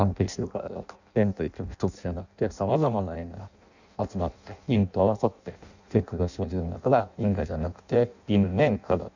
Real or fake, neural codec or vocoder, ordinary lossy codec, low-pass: fake; codec, 16 kHz in and 24 kHz out, 0.6 kbps, FireRedTTS-2 codec; none; 7.2 kHz